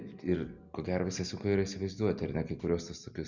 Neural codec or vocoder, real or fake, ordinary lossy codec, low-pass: none; real; MP3, 64 kbps; 7.2 kHz